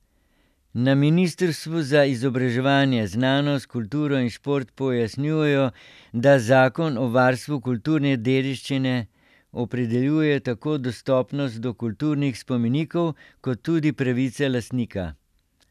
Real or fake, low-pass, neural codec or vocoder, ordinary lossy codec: real; 14.4 kHz; none; none